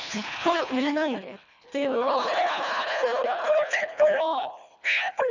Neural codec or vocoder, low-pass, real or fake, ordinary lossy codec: codec, 24 kHz, 1.5 kbps, HILCodec; 7.2 kHz; fake; none